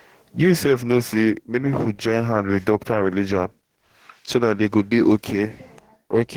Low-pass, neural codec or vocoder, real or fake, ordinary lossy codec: 19.8 kHz; codec, 44.1 kHz, 2.6 kbps, DAC; fake; Opus, 16 kbps